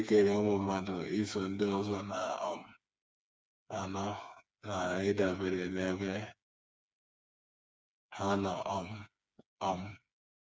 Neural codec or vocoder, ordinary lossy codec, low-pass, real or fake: codec, 16 kHz, 4 kbps, FreqCodec, smaller model; none; none; fake